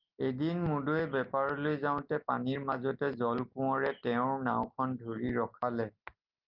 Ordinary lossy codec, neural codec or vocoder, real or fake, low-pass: Opus, 32 kbps; none; real; 5.4 kHz